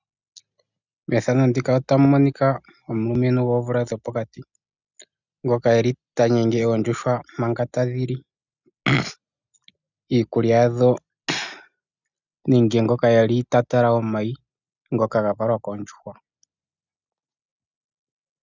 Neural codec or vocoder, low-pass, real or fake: none; 7.2 kHz; real